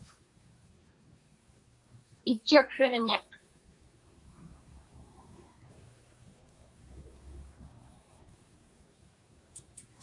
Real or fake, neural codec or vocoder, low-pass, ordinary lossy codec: fake; codec, 24 kHz, 1 kbps, SNAC; 10.8 kHz; AAC, 64 kbps